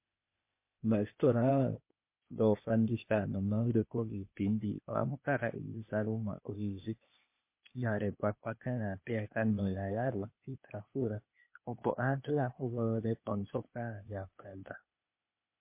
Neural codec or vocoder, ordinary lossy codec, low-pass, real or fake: codec, 16 kHz, 0.8 kbps, ZipCodec; MP3, 24 kbps; 3.6 kHz; fake